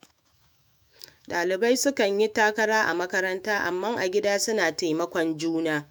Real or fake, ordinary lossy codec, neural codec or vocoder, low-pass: fake; none; autoencoder, 48 kHz, 128 numbers a frame, DAC-VAE, trained on Japanese speech; none